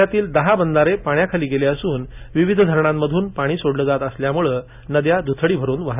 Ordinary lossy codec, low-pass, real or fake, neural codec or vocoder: none; 3.6 kHz; real; none